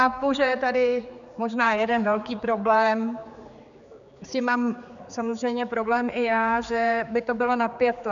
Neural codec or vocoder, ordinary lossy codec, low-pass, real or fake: codec, 16 kHz, 4 kbps, X-Codec, HuBERT features, trained on general audio; MP3, 96 kbps; 7.2 kHz; fake